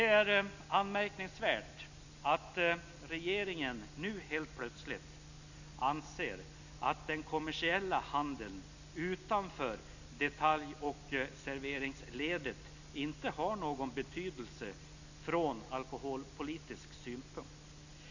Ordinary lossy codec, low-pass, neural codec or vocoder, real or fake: none; 7.2 kHz; none; real